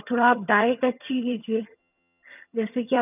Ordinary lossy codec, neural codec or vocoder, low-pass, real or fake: none; vocoder, 22.05 kHz, 80 mel bands, HiFi-GAN; 3.6 kHz; fake